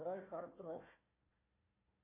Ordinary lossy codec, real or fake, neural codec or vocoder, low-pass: AAC, 32 kbps; fake; codec, 16 kHz, 1 kbps, FunCodec, trained on Chinese and English, 50 frames a second; 3.6 kHz